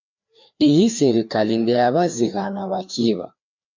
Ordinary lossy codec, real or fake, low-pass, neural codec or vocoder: MP3, 64 kbps; fake; 7.2 kHz; codec, 16 kHz, 2 kbps, FreqCodec, larger model